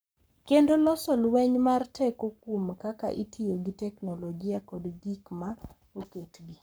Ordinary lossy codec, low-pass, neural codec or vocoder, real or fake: none; none; codec, 44.1 kHz, 7.8 kbps, Pupu-Codec; fake